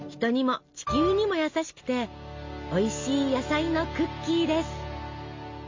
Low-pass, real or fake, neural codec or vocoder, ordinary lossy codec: 7.2 kHz; real; none; MP3, 48 kbps